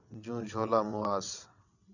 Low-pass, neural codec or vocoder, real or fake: 7.2 kHz; vocoder, 22.05 kHz, 80 mel bands, WaveNeXt; fake